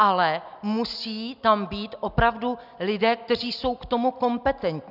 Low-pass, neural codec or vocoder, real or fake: 5.4 kHz; none; real